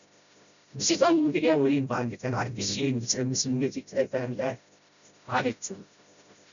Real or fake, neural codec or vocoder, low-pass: fake; codec, 16 kHz, 0.5 kbps, FreqCodec, smaller model; 7.2 kHz